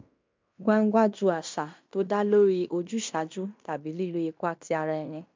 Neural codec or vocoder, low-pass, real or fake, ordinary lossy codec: codec, 16 kHz in and 24 kHz out, 0.9 kbps, LongCat-Audio-Codec, fine tuned four codebook decoder; 7.2 kHz; fake; MP3, 64 kbps